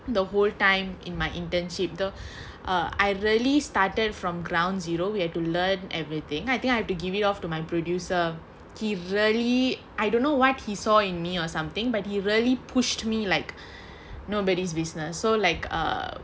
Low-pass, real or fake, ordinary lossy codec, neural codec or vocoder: none; real; none; none